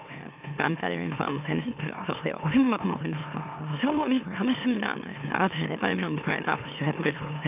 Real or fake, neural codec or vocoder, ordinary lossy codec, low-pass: fake; autoencoder, 44.1 kHz, a latent of 192 numbers a frame, MeloTTS; AAC, 32 kbps; 3.6 kHz